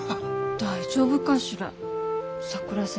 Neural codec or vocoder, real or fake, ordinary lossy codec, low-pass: none; real; none; none